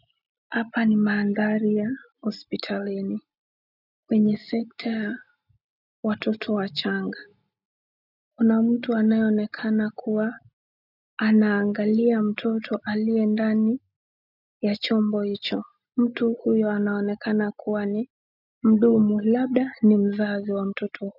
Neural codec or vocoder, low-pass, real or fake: none; 5.4 kHz; real